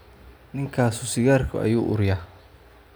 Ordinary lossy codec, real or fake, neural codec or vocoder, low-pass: none; real; none; none